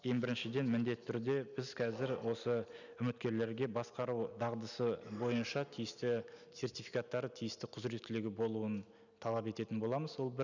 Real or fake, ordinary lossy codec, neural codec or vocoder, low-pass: real; none; none; 7.2 kHz